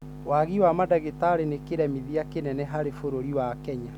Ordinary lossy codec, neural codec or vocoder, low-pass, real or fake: none; none; 19.8 kHz; real